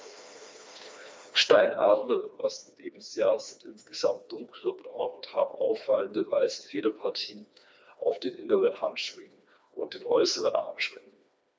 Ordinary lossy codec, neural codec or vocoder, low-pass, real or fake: none; codec, 16 kHz, 2 kbps, FreqCodec, smaller model; none; fake